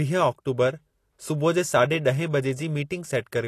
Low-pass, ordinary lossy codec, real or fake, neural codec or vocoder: 14.4 kHz; AAC, 48 kbps; real; none